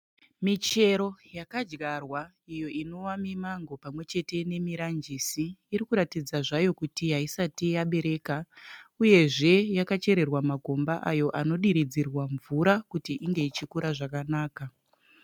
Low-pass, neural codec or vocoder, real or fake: 19.8 kHz; none; real